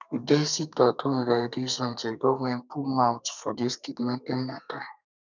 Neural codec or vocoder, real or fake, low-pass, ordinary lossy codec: codec, 32 kHz, 1.9 kbps, SNAC; fake; 7.2 kHz; none